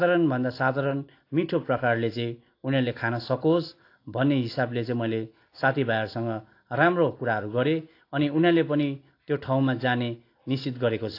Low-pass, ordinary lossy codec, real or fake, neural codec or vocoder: 5.4 kHz; AAC, 32 kbps; real; none